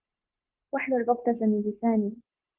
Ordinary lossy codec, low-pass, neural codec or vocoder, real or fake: Opus, 32 kbps; 3.6 kHz; codec, 16 kHz, 0.9 kbps, LongCat-Audio-Codec; fake